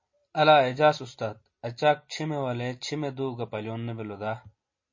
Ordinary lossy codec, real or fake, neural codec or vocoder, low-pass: MP3, 32 kbps; real; none; 7.2 kHz